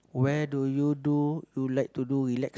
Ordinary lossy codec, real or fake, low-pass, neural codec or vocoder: none; real; none; none